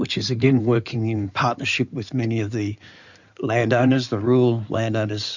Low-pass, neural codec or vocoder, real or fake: 7.2 kHz; codec, 16 kHz in and 24 kHz out, 2.2 kbps, FireRedTTS-2 codec; fake